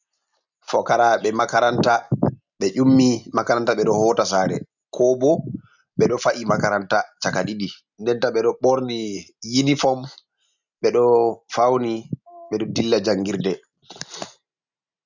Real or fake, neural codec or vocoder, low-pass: real; none; 7.2 kHz